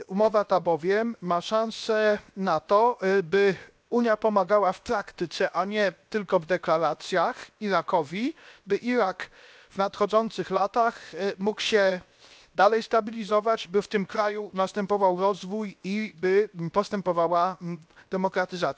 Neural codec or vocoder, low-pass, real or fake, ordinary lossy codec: codec, 16 kHz, 0.7 kbps, FocalCodec; none; fake; none